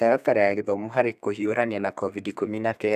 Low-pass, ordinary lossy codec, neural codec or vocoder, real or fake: 14.4 kHz; none; codec, 32 kHz, 1.9 kbps, SNAC; fake